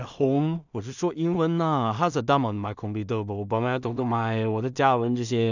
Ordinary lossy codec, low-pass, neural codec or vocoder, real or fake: none; 7.2 kHz; codec, 16 kHz in and 24 kHz out, 0.4 kbps, LongCat-Audio-Codec, two codebook decoder; fake